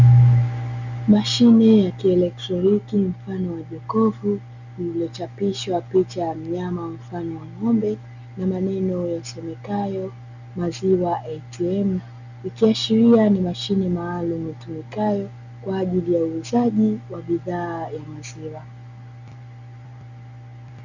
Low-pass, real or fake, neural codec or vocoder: 7.2 kHz; real; none